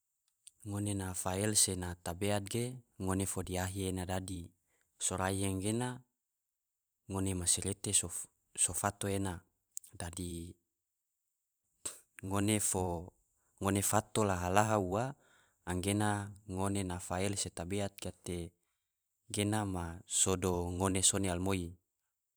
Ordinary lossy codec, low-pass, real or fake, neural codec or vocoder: none; none; fake; vocoder, 44.1 kHz, 128 mel bands every 512 samples, BigVGAN v2